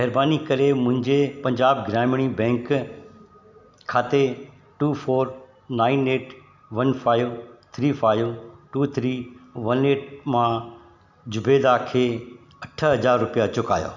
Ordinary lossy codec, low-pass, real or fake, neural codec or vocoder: none; 7.2 kHz; real; none